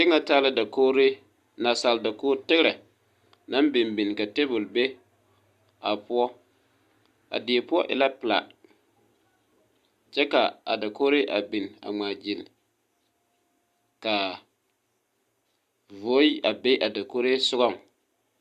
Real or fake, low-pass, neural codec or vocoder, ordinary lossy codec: real; 14.4 kHz; none; Opus, 64 kbps